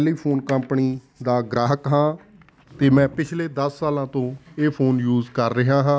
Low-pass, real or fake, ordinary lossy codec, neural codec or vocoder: none; real; none; none